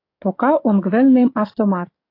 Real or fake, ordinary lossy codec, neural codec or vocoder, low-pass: fake; AAC, 32 kbps; codec, 44.1 kHz, 7.8 kbps, DAC; 5.4 kHz